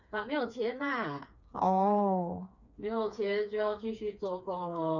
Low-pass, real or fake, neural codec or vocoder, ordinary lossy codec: 7.2 kHz; fake; codec, 16 kHz, 4 kbps, FreqCodec, smaller model; none